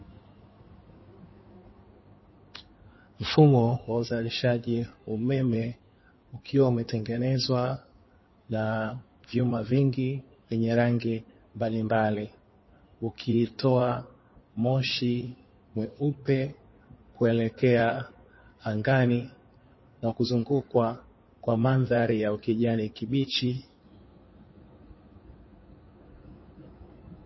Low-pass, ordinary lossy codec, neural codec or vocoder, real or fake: 7.2 kHz; MP3, 24 kbps; codec, 16 kHz in and 24 kHz out, 2.2 kbps, FireRedTTS-2 codec; fake